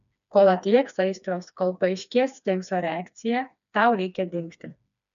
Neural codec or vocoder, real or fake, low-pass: codec, 16 kHz, 2 kbps, FreqCodec, smaller model; fake; 7.2 kHz